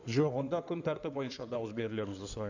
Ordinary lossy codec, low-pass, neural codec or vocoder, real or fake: none; 7.2 kHz; codec, 16 kHz in and 24 kHz out, 2.2 kbps, FireRedTTS-2 codec; fake